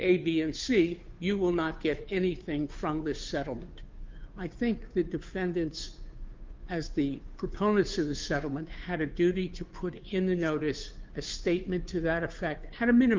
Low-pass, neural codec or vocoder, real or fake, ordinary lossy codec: 7.2 kHz; codec, 16 kHz, 2 kbps, FunCodec, trained on Chinese and English, 25 frames a second; fake; Opus, 32 kbps